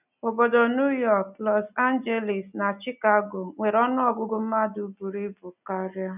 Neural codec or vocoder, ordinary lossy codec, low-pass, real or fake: none; none; 3.6 kHz; real